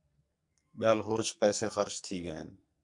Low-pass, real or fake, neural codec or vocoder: 10.8 kHz; fake; codec, 44.1 kHz, 2.6 kbps, SNAC